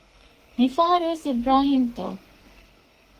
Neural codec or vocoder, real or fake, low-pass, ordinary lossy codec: codec, 32 kHz, 1.9 kbps, SNAC; fake; 14.4 kHz; Opus, 24 kbps